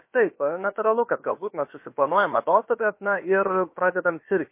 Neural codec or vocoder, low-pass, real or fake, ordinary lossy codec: codec, 16 kHz, about 1 kbps, DyCAST, with the encoder's durations; 3.6 kHz; fake; MP3, 24 kbps